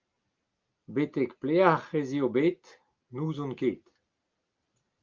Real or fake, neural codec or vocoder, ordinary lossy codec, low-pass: real; none; Opus, 32 kbps; 7.2 kHz